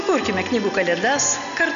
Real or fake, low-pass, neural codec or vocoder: real; 7.2 kHz; none